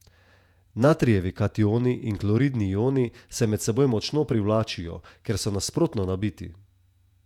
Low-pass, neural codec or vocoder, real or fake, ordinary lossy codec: 19.8 kHz; vocoder, 48 kHz, 128 mel bands, Vocos; fake; none